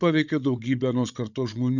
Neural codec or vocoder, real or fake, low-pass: codec, 16 kHz, 8 kbps, FreqCodec, larger model; fake; 7.2 kHz